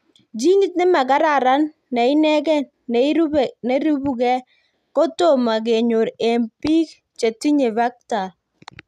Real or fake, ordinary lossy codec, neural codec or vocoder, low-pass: real; none; none; 14.4 kHz